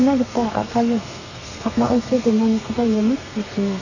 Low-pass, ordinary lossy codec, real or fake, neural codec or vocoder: 7.2 kHz; none; fake; codec, 32 kHz, 1.9 kbps, SNAC